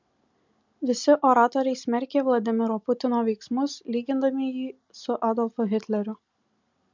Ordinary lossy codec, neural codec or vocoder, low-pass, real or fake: MP3, 64 kbps; none; 7.2 kHz; real